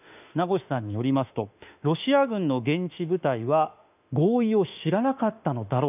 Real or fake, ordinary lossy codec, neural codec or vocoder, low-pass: fake; none; autoencoder, 48 kHz, 32 numbers a frame, DAC-VAE, trained on Japanese speech; 3.6 kHz